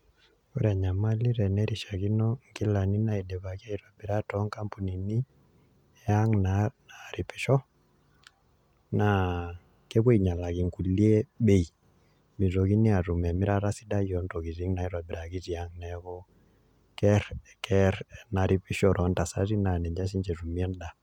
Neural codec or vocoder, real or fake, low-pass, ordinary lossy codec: none; real; 19.8 kHz; none